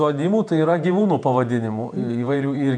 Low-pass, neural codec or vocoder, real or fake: 9.9 kHz; vocoder, 48 kHz, 128 mel bands, Vocos; fake